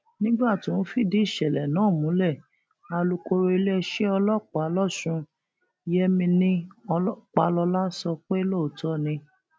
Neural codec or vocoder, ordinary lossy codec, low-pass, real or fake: none; none; none; real